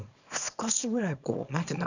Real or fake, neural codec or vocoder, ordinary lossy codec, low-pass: fake; codec, 24 kHz, 0.9 kbps, WavTokenizer, small release; none; 7.2 kHz